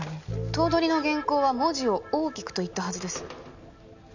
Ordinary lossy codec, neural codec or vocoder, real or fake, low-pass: none; none; real; 7.2 kHz